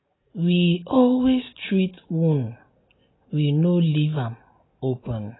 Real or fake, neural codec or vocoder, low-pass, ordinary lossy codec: real; none; 7.2 kHz; AAC, 16 kbps